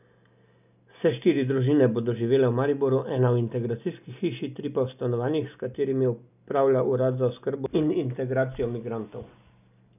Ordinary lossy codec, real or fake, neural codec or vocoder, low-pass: none; real; none; 3.6 kHz